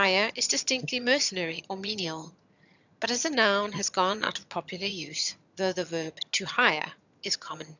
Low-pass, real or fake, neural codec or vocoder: 7.2 kHz; fake; vocoder, 22.05 kHz, 80 mel bands, HiFi-GAN